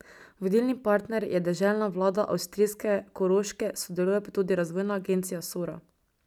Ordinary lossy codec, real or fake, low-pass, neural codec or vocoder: none; real; 19.8 kHz; none